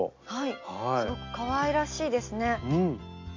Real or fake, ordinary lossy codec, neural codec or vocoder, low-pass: real; MP3, 48 kbps; none; 7.2 kHz